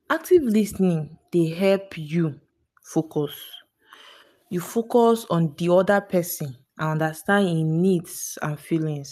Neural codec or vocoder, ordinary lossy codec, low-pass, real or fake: none; none; 14.4 kHz; real